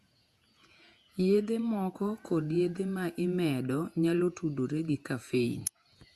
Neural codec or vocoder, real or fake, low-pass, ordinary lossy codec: vocoder, 48 kHz, 128 mel bands, Vocos; fake; 14.4 kHz; Opus, 64 kbps